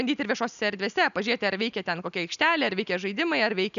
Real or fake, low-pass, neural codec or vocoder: real; 7.2 kHz; none